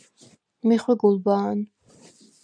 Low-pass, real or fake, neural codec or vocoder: 9.9 kHz; real; none